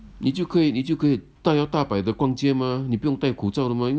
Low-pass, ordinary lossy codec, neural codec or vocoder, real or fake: none; none; none; real